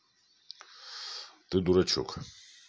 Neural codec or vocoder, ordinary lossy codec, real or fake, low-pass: none; none; real; none